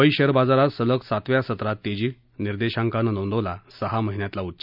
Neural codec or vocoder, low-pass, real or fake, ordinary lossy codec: none; 5.4 kHz; real; none